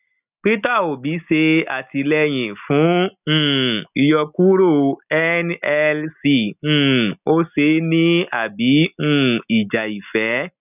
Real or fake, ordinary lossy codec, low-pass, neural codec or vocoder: real; none; 3.6 kHz; none